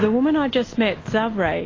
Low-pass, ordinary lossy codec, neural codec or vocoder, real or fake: 7.2 kHz; AAC, 32 kbps; none; real